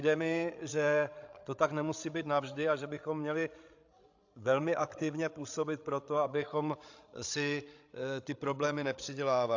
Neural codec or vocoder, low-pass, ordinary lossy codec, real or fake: codec, 16 kHz, 16 kbps, FreqCodec, larger model; 7.2 kHz; AAC, 48 kbps; fake